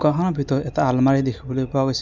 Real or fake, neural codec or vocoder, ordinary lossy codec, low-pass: real; none; none; none